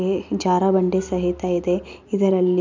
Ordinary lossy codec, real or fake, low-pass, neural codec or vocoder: MP3, 64 kbps; real; 7.2 kHz; none